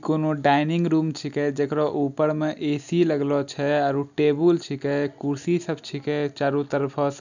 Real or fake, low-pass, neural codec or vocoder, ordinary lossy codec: real; 7.2 kHz; none; none